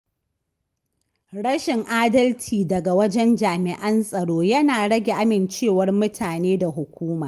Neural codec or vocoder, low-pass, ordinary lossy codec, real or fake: none; 14.4 kHz; Opus, 32 kbps; real